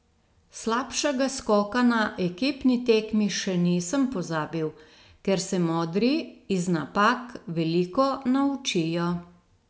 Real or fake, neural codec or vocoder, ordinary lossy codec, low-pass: real; none; none; none